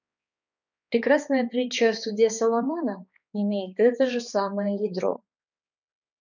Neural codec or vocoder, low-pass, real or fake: codec, 16 kHz, 2 kbps, X-Codec, HuBERT features, trained on balanced general audio; 7.2 kHz; fake